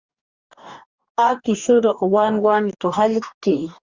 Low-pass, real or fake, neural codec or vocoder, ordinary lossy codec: 7.2 kHz; fake; codec, 44.1 kHz, 2.6 kbps, DAC; Opus, 64 kbps